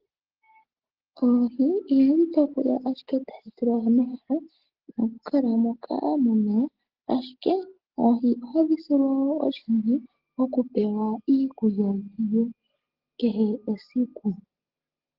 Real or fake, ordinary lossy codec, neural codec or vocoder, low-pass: real; Opus, 16 kbps; none; 5.4 kHz